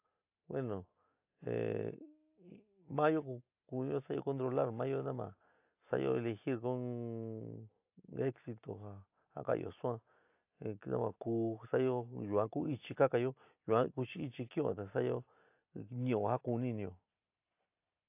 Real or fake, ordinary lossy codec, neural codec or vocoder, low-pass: real; AAC, 32 kbps; none; 3.6 kHz